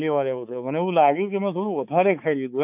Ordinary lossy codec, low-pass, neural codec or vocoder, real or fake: none; 3.6 kHz; codec, 16 kHz, 4 kbps, X-Codec, HuBERT features, trained on balanced general audio; fake